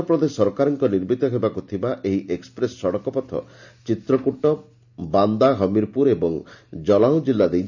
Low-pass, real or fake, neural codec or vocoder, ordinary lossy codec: 7.2 kHz; real; none; none